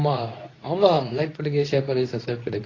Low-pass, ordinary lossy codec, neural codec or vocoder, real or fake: 7.2 kHz; AAC, 32 kbps; codec, 24 kHz, 0.9 kbps, WavTokenizer, medium speech release version 2; fake